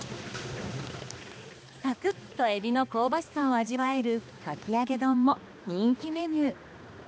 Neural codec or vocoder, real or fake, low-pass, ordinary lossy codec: codec, 16 kHz, 2 kbps, X-Codec, HuBERT features, trained on balanced general audio; fake; none; none